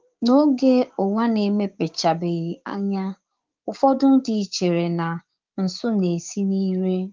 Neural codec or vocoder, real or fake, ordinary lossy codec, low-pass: codec, 16 kHz, 6 kbps, DAC; fake; Opus, 32 kbps; 7.2 kHz